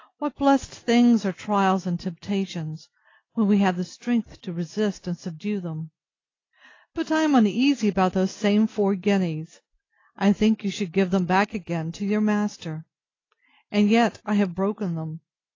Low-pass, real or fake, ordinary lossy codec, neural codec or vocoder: 7.2 kHz; real; AAC, 32 kbps; none